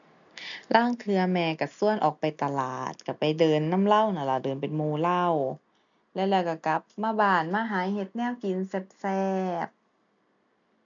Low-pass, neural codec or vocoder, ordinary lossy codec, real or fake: 7.2 kHz; none; none; real